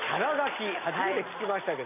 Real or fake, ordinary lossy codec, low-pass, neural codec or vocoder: real; none; 3.6 kHz; none